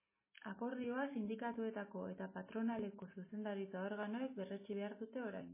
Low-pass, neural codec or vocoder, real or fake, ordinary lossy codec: 3.6 kHz; none; real; MP3, 16 kbps